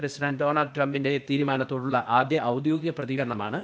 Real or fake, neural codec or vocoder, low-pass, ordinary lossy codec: fake; codec, 16 kHz, 0.8 kbps, ZipCodec; none; none